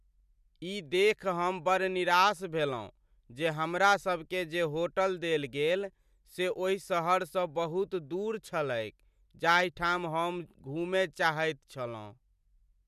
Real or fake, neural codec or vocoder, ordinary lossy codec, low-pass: real; none; none; 14.4 kHz